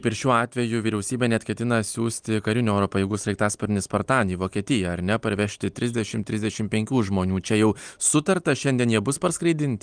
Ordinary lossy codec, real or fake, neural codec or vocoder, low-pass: Opus, 32 kbps; real; none; 9.9 kHz